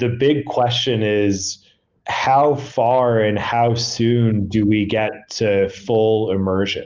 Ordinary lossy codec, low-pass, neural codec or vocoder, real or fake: Opus, 32 kbps; 7.2 kHz; none; real